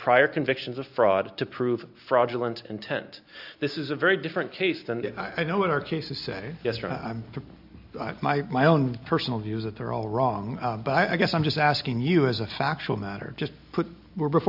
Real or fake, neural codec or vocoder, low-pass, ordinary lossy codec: real; none; 5.4 kHz; AAC, 48 kbps